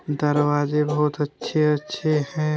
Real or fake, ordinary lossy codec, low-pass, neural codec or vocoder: real; none; none; none